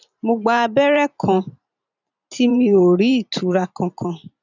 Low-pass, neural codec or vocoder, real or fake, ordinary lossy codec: 7.2 kHz; vocoder, 44.1 kHz, 128 mel bands every 512 samples, BigVGAN v2; fake; none